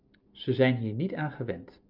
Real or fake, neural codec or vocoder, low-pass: real; none; 5.4 kHz